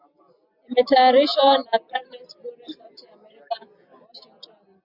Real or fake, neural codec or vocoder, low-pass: real; none; 5.4 kHz